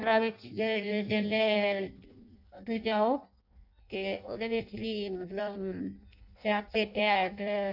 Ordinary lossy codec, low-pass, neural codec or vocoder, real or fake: none; 5.4 kHz; codec, 16 kHz in and 24 kHz out, 0.6 kbps, FireRedTTS-2 codec; fake